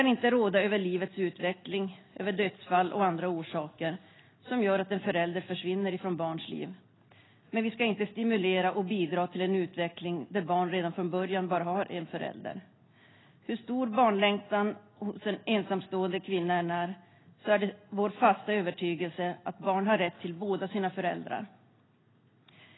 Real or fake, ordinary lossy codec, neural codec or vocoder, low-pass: real; AAC, 16 kbps; none; 7.2 kHz